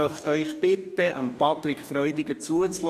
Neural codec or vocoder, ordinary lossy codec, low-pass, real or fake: codec, 44.1 kHz, 2.6 kbps, DAC; none; 14.4 kHz; fake